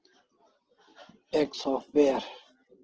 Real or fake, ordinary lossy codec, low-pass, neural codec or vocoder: real; Opus, 16 kbps; 7.2 kHz; none